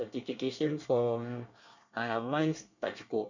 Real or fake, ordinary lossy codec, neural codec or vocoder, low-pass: fake; none; codec, 24 kHz, 1 kbps, SNAC; 7.2 kHz